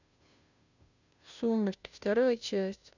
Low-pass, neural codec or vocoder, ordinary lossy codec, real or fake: 7.2 kHz; codec, 16 kHz, 0.5 kbps, FunCodec, trained on Chinese and English, 25 frames a second; none; fake